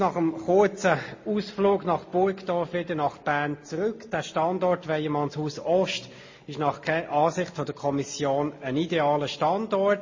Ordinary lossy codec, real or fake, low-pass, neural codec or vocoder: MP3, 32 kbps; real; 7.2 kHz; none